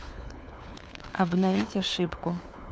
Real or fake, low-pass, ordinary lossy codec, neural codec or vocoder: fake; none; none; codec, 16 kHz, 4 kbps, FunCodec, trained on LibriTTS, 50 frames a second